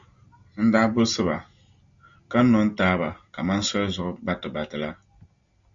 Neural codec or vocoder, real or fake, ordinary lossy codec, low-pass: none; real; Opus, 64 kbps; 7.2 kHz